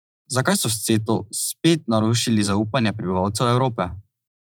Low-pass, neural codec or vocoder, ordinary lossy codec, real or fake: none; vocoder, 44.1 kHz, 128 mel bands every 512 samples, BigVGAN v2; none; fake